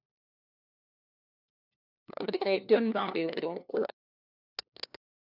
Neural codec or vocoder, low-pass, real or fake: codec, 16 kHz, 1 kbps, FunCodec, trained on LibriTTS, 50 frames a second; 5.4 kHz; fake